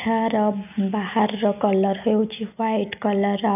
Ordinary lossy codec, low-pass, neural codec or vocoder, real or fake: none; 3.6 kHz; none; real